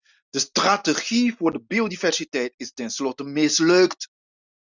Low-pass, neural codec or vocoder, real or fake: 7.2 kHz; none; real